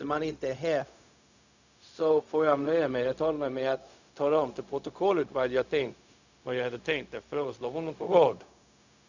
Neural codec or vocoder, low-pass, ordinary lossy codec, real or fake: codec, 16 kHz, 0.4 kbps, LongCat-Audio-Codec; 7.2 kHz; none; fake